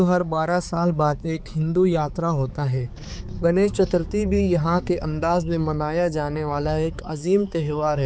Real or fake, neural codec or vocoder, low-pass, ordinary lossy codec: fake; codec, 16 kHz, 4 kbps, X-Codec, HuBERT features, trained on balanced general audio; none; none